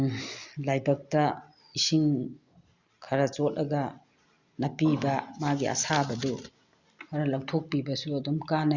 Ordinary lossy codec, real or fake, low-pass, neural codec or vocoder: Opus, 64 kbps; real; 7.2 kHz; none